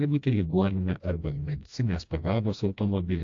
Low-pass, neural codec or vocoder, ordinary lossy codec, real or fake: 7.2 kHz; codec, 16 kHz, 1 kbps, FreqCodec, smaller model; AAC, 48 kbps; fake